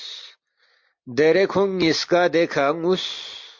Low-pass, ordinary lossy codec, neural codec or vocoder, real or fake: 7.2 kHz; MP3, 48 kbps; none; real